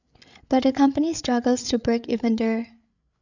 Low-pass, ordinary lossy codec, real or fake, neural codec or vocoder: 7.2 kHz; none; fake; codec, 16 kHz, 8 kbps, FreqCodec, larger model